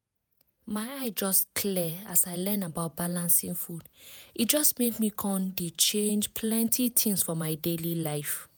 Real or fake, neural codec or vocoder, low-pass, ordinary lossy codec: fake; vocoder, 48 kHz, 128 mel bands, Vocos; none; none